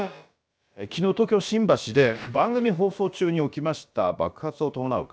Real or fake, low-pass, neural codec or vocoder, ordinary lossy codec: fake; none; codec, 16 kHz, about 1 kbps, DyCAST, with the encoder's durations; none